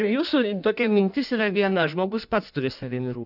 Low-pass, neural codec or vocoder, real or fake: 5.4 kHz; codec, 16 kHz in and 24 kHz out, 1.1 kbps, FireRedTTS-2 codec; fake